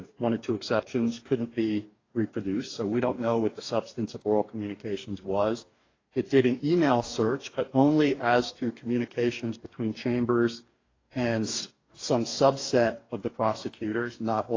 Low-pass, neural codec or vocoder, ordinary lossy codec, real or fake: 7.2 kHz; codec, 44.1 kHz, 2.6 kbps, DAC; AAC, 32 kbps; fake